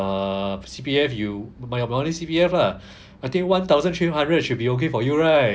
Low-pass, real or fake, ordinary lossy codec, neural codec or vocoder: none; real; none; none